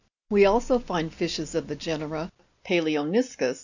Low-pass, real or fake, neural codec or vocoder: 7.2 kHz; real; none